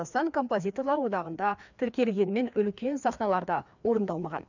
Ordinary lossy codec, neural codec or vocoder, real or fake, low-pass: none; codec, 16 kHz, 2 kbps, FreqCodec, larger model; fake; 7.2 kHz